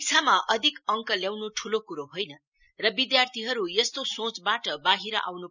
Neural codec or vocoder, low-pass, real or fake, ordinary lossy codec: none; 7.2 kHz; real; none